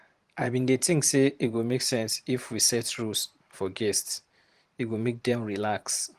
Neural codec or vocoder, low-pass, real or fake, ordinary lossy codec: vocoder, 44.1 kHz, 128 mel bands every 512 samples, BigVGAN v2; 14.4 kHz; fake; Opus, 24 kbps